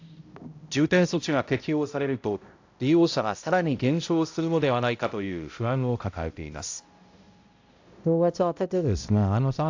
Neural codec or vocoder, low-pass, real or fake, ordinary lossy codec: codec, 16 kHz, 0.5 kbps, X-Codec, HuBERT features, trained on balanced general audio; 7.2 kHz; fake; AAC, 48 kbps